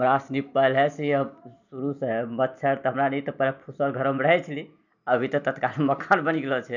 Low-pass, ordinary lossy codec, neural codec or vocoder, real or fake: 7.2 kHz; none; none; real